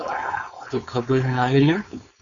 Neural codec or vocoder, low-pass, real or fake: codec, 16 kHz, 4.8 kbps, FACodec; 7.2 kHz; fake